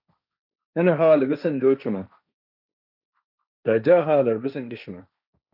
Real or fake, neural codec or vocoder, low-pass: fake; codec, 16 kHz, 1.1 kbps, Voila-Tokenizer; 5.4 kHz